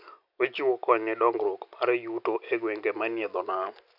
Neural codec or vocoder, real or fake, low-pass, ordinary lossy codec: none; real; 5.4 kHz; none